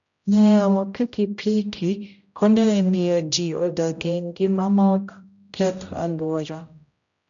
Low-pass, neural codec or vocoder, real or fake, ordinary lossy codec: 7.2 kHz; codec, 16 kHz, 0.5 kbps, X-Codec, HuBERT features, trained on general audio; fake; none